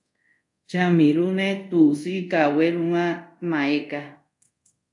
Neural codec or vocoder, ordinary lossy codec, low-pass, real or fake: codec, 24 kHz, 0.5 kbps, DualCodec; AAC, 64 kbps; 10.8 kHz; fake